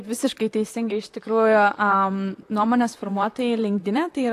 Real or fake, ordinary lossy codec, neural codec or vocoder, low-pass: fake; AAC, 64 kbps; vocoder, 44.1 kHz, 128 mel bands, Pupu-Vocoder; 14.4 kHz